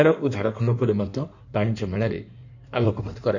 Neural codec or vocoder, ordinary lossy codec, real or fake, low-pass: codec, 16 kHz in and 24 kHz out, 1.1 kbps, FireRedTTS-2 codec; none; fake; 7.2 kHz